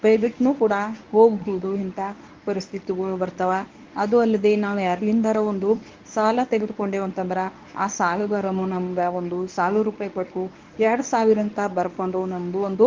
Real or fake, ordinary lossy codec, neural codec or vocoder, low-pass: fake; Opus, 32 kbps; codec, 24 kHz, 0.9 kbps, WavTokenizer, medium speech release version 1; 7.2 kHz